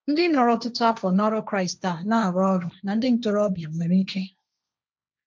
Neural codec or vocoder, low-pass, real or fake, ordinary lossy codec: codec, 16 kHz, 1.1 kbps, Voila-Tokenizer; none; fake; none